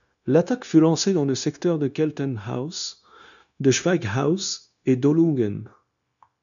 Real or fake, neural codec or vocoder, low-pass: fake; codec, 16 kHz, 0.9 kbps, LongCat-Audio-Codec; 7.2 kHz